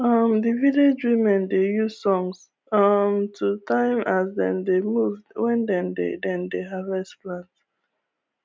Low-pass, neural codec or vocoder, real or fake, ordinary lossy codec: none; none; real; none